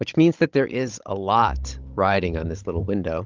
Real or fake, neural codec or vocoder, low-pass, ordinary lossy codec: fake; codec, 16 kHz, 16 kbps, FunCodec, trained on Chinese and English, 50 frames a second; 7.2 kHz; Opus, 32 kbps